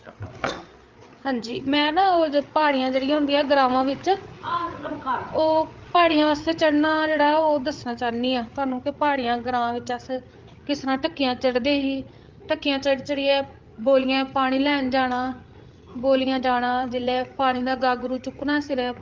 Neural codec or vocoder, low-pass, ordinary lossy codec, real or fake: codec, 16 kHz, 8 kbps, FreqCodec, larger model; 7.2 kHz; Opus, 32 kbps; fake